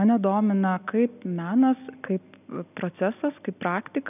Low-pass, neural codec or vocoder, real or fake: 3.6 kHz; none; real